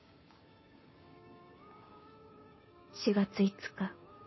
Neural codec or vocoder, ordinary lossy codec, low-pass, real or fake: codec, 16 kHz, 6 kbps, DAC; MP3, 24 kbps; 7.2 kHz; fake